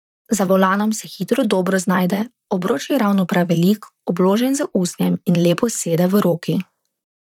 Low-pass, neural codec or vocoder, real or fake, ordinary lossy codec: 19.8 kHz; vocoder, 44.1 kHz, 128 mel bands, Pupu-Vocoder; fake; none